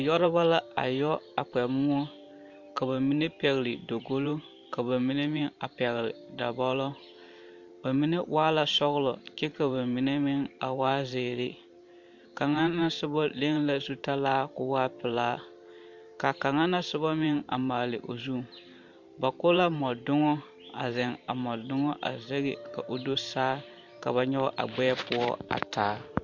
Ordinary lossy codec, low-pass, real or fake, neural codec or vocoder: MP3, 64 kbps; 7.2 kHz; fake; vocoder, 44.1 kHz, 128 mel bands every 512 samples, BigVGAN v2